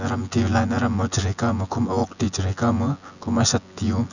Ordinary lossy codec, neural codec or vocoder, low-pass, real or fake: none; vocoder, 24 kHz, 100 mel bands, Vocos; 7.2 kHz; fake